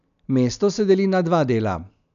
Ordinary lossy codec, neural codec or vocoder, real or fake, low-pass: none; none; real; 7.2 kHz